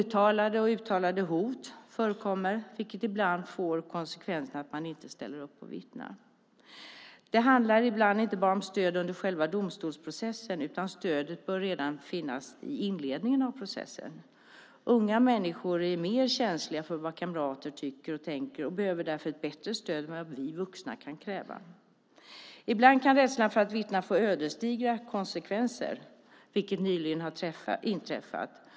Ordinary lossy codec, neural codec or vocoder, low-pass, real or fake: none; none; none; real